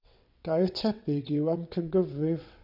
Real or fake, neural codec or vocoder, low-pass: fake; vocoder, 22.05 kHz, 80 mel bands, WaveNeXt; 5.4 kHz